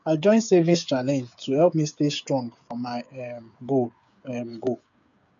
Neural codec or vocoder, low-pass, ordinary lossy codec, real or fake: codec, 16 kHz, 8 kbps, FreqCodec, smaller model; 7.2 kHz; none; fake